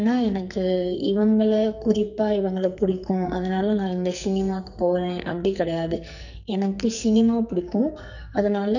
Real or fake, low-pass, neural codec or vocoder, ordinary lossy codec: fake; 7.2 kHz; codec, 44.1 kHz, 2.6 kbps, SNAC; none